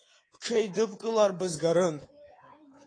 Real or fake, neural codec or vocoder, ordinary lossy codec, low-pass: fake; codec, 24 kHz, 3.1 kbps, DualCodec; AAC, 32 kbps; 9.9 kHz